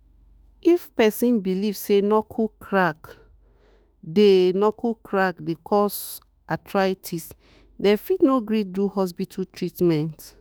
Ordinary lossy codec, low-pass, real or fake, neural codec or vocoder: none; none; fake; autoencoder, 48 kHz, 32 numbers a frame, DAC-VAE, trained on Japanese speech